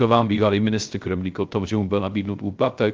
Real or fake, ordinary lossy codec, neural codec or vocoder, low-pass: fake; Opus, 24 kbps; codec, 16 kHz, 0.3 kbps, FocalCodec; 7.2 kHz